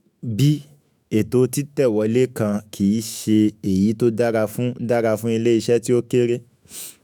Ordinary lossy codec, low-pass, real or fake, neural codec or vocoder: none; none; fake; autoencoder, 48 kHz, 128 numbers a frame, DAC-VAE, trained on Japanese speech